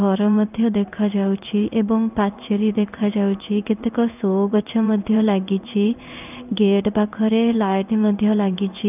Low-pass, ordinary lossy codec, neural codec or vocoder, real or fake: 3.6 kHz; none; codec, 16 kHz in and 24 kHz out, 1 kbps, XY-Tokenizer; fake